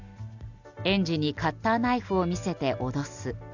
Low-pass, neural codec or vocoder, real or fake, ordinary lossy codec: 7.2 kHz; none; real; none